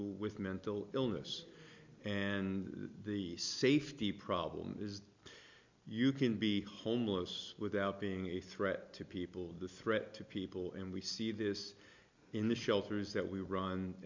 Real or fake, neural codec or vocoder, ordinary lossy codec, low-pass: real; none; MP3, 64 kbps; 7.2 kHz